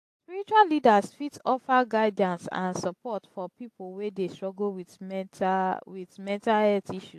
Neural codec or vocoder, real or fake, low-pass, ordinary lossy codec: none; real; 14.4 kHz; AAC, 64 kbps